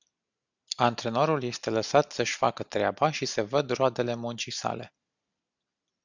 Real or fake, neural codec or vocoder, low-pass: real; none; 7.2 kHz